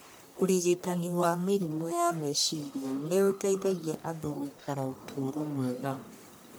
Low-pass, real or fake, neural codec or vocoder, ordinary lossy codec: none; fake; codec, 44.1 kHz, 1.7 kbps, Pupu-Codec; none